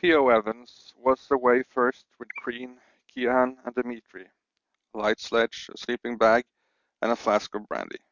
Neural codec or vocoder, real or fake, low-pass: none; real; 7.2 kHz